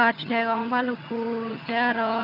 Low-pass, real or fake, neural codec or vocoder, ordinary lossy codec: 5.4 kHz; fake; vocoder, 22.05 kHz, 80 mel bands, HiFi-GAN; none